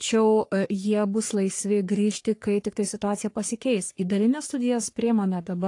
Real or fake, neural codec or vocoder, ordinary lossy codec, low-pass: fake; codec, 44.1 kHz, 3.4 kbps, Pupu-Codec; AAC, 48 kbps; 10.8 kHz